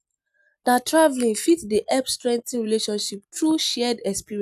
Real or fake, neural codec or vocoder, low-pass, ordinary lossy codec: real; none; 14.4 kHz; none